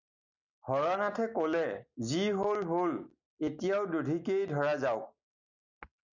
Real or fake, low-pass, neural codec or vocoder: real; 7.2 kHz; none